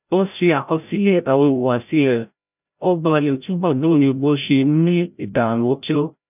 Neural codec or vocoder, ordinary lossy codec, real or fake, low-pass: codec, 16 kHz, 0.5 kbps, FreqCodec, larger model; none; fake; 3.6 kHz